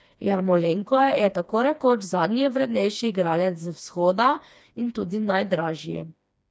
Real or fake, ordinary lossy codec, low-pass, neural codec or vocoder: fake; none; none; codec, 16 kHz, 2 kbps, FreqCodec, smaller model